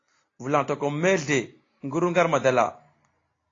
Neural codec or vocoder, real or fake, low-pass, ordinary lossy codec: none; real; 7.2 kHz; AAC, 32 kbps